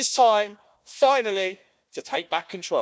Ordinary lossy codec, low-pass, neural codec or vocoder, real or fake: none; none; codec, 16 kHz, 1 kbps, FunCodec, trained on Chinese and English, 50 frames a second; fake